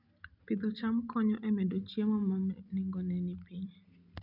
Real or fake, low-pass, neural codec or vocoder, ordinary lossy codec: real; 5.4 kHz; none; none